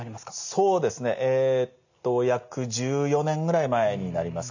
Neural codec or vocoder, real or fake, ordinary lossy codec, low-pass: none; real; none; 7.2 kHz